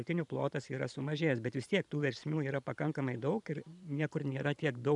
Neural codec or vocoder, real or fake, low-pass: vocoder, 44.1 kHz, 128 mel bands every 512 samples, BigVGAN v2; fake; 10.8 kHz